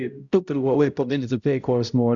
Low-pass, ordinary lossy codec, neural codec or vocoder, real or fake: 7.2 kHz; Opus, 64 kbps; codec, 16 kHz, 0.5 kbps, X-Codec, HuBERT features, trained on balanced general audio; fake